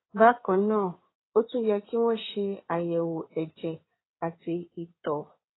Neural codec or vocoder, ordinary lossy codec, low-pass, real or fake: codec, 16 kHz in and 24 kHz out, 2.2 kbps, FireRedTTS-2 codec; AAC, 16 kbps; 7.2 kHz; fake